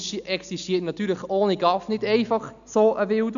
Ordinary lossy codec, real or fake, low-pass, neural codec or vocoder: none; real; 7.2 kHz; none